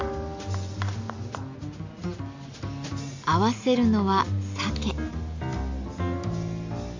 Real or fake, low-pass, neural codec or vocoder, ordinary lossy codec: real; 7.2 kHz; none; MP3, 48 kbps